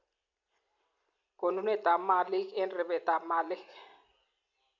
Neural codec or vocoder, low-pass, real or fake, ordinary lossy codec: none; 7.2 kHz; real; none